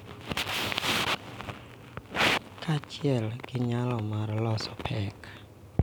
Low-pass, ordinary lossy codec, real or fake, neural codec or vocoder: none; none; real; none